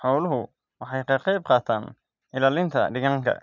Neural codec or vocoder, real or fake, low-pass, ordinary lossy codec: none; real; 7.2 kHz; none